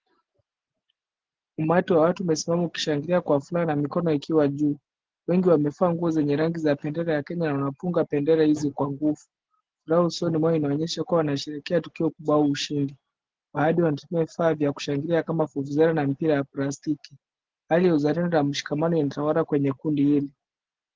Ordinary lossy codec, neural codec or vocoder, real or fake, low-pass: Opus, 16 kbps; none; real; 7.2 kHz